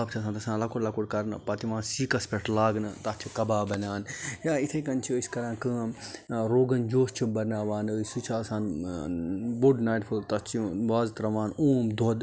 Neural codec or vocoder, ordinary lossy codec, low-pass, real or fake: none; none; none; real